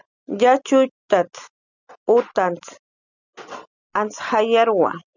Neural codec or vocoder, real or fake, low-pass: none; real; 7.2 kHz